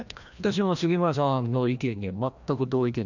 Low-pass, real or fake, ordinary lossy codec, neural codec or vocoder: 7.2 kHz; fake; none; codec, 16 kHz, 1 kbps, FreqCodec, larger model